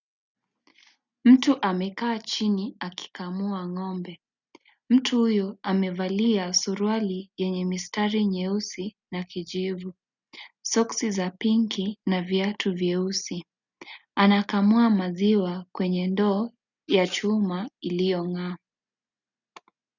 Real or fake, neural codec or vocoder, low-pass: real; none; 7.2 kHz